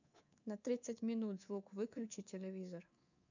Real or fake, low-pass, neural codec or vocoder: fake; 7.2 kHz; codec, 24 kHz, 3.1 kbps, DualCodec